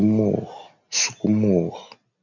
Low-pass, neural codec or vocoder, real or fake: 7.2 kHz; none; real